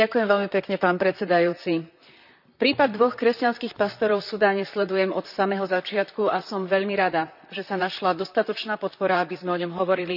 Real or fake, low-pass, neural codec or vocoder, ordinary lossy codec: fake; 5.4 kHz; vocoder, 44.1 kHz, 128 mel bands, Pupu-Vocoder; none